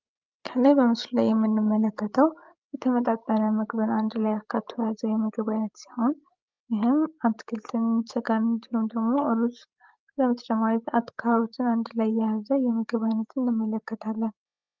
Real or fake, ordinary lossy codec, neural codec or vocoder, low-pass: fake; Opus, 32 kbps; codec, 16 kHz, 16 kbps, FreqCodec, larger model; 7.2 kHz